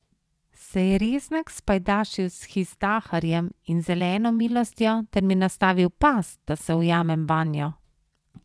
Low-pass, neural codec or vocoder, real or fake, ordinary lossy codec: none; vocoder, 22.05 kHz, 80 mel bands, WaveNeXt; fake; none